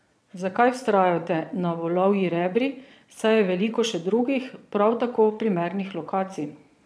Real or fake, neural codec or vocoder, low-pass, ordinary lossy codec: fake; vocoder, 22.05 kHz, 80 mel bands, Vocos; none; none